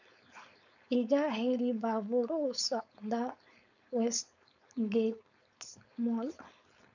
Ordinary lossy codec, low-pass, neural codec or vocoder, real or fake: none; 7.2 kHz; codec, 16 kHz, 4.8 kbps, FACodec; fake